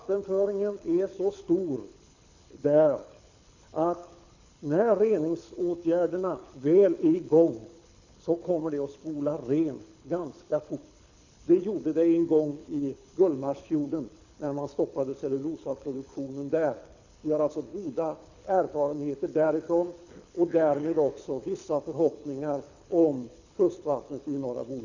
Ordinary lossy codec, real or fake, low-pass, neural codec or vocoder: none; fake; 7.2 kHz; codec, 24 kHz, 6 kbps, HILCodec